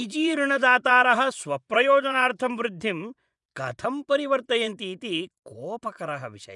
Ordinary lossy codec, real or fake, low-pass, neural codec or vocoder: none; fake; 10.8 kHz; vocoder, 24 kHz, 100 mel bands, Vocos